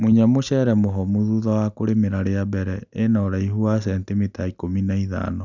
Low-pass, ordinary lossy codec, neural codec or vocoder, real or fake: 7.2 kHz; none; none; real